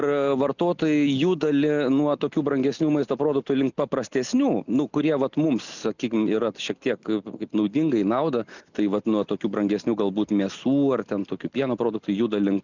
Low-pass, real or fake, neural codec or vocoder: 7.2 kHz; real; none